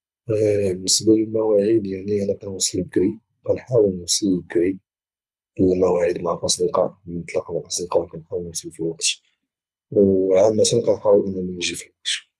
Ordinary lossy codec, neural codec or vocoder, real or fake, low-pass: none; codec, 24 kHz, 6 kbps, HILCodec; fake; none